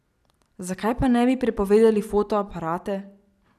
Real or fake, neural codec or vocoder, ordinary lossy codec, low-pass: real; none; none; 14.4 kHz